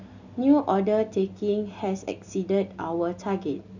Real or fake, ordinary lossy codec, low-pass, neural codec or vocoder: real; none; 7.2 kHz; none